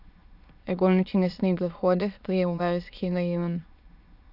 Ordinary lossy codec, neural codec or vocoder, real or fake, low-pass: none; autoencoder, 22.05 kHz, a latent of 192 numbers a frame, VITS, trained on many speakers; fake; 5.4 kHz